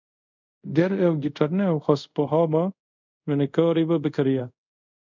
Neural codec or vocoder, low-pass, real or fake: codec, 24 kHz, 0.5 kbps, DualCodec; 7.2 kHz; fake